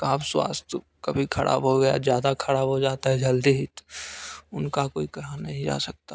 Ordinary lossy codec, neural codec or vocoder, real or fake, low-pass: none; none; real; none